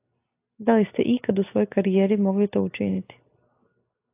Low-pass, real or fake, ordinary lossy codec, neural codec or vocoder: 3.6 kHz; real; AAC, 24 kbps; none